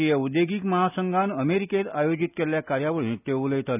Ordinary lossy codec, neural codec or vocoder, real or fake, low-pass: none; none; real; 3.6 kHz